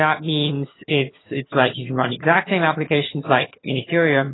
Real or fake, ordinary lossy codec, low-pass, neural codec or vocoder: fake; AAC, 16 kbps; 7.2 kHz; vocoder, 22.05 kHz, 80 mel bands, HiFi-GAN